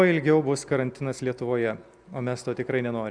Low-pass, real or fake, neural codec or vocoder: 9.9 kHz; real; none